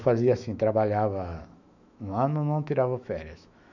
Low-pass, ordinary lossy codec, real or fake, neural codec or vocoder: 7.2 kHz; none; fake; autoencoder, 48 kHz, 128 numbers a frame, DAC-VAE, trained on Japanese speech